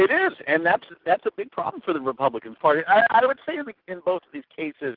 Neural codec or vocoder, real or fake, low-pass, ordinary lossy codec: none; real; 5.4 kHz; Opus, 16 kbps